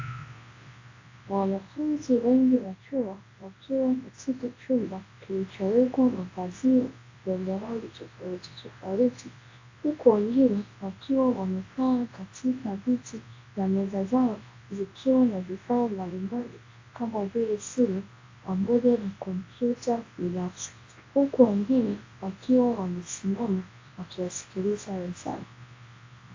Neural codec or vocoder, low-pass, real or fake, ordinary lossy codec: codec, 24 kHz, 0.9 kbps, WavTokenizer, large speech release; 7.2 kHz; fake; AAC, 32 kbps